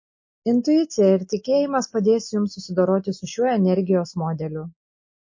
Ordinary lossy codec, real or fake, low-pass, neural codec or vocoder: MP3, 32 kbps; real; 7.2 kHz; none